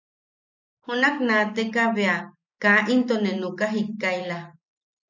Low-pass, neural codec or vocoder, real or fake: 7.2 kHz; none; real